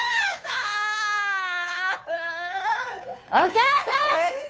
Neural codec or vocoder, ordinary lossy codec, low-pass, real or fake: codec, 16 kHz, 2 kbps, FunCodec, trained on Chinese and English, 25 frames a second; none; none; fake